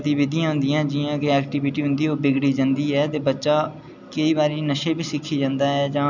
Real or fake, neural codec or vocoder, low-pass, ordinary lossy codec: real; none; 7.2 kHz; none